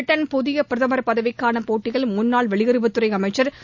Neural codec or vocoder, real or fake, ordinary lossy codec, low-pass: none; real; none; 7.2 kHz